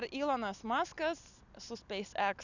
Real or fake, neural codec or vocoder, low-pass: real; none; 7.2 kHz